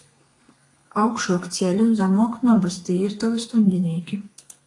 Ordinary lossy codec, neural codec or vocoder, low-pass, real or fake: AAC, 48 kbps; codec, 32 kHz, 1.9 kbps, SNAC; 10.8 kHz; fake